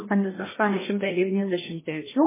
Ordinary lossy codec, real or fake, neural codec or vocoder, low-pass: MP3, 16 kbps; fake; codec, 16 kHz, 1 kbps, FreqCodec, larger model; 3.6 kHz